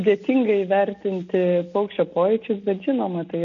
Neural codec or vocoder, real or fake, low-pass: none; real; 7.2 kHz